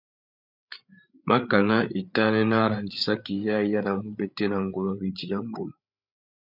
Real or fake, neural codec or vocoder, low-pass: fake; codec, 16 kHz, 8 kbps, FreqCodec, larger model; 5.4 kHz